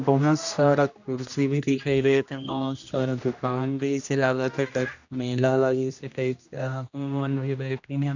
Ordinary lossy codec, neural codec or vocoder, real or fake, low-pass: none; codec, 16 kHz, 1 kbps, X-Codec, HuBERT features, trained on general audio; fake; 7.2 kHz